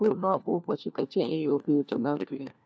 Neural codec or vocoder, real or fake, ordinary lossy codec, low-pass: codec, 16 kHz, 1 kbps, FunCodec, trained on LibriTTS, 50 frames a second; fake; none; none